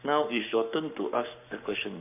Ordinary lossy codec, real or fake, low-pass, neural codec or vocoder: none; fake; 3.6 kHz; codec, 44.1 kHz, 7.8 kbps, Pupu-Codec